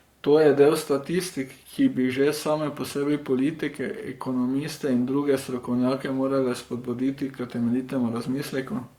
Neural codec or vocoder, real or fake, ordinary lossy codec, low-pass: codec, 44.1 kHz, 7.8 kbps, Pupu-Codec; fake; Opus, 64 kbps; 19.8 kHz